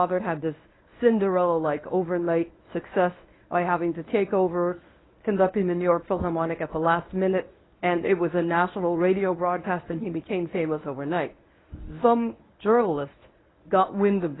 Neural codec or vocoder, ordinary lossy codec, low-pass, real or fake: codec, 24 kHz, 0.9 kbps, WavTokenizer, medium speech release version 1; AAC, 16 kbps; 7.2 kHz; fake